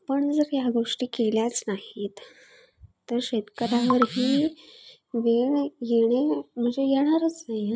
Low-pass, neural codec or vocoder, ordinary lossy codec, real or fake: none; none; none; real